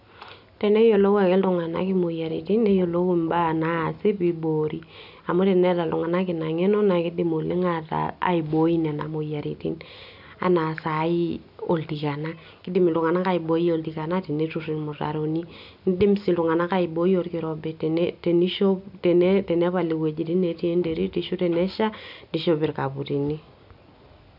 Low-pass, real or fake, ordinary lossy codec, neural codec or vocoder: 5.4 kHz; real; none; none